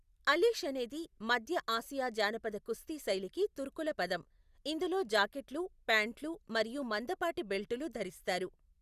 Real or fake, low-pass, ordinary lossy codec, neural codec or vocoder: real; 14.4 kHz; Opus, 64 kbps; none